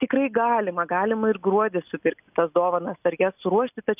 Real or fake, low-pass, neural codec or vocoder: real; 3.6 kHz; none